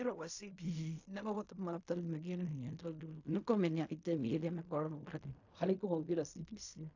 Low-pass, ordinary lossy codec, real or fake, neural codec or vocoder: 7.2 kHz; AAC, 48 kbps; fake; codec, 16 kHz in and 24 kHz out, 0.4 kbps, LongCat-Audio-Codec, fine tuned four codebook decoder